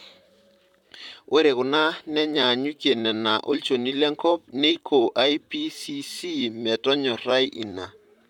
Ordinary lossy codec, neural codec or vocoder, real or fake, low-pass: none; vocoder, 44.1 kHz, 128 mel bands, Pupu-Vocoder; fake; 19.8 kHz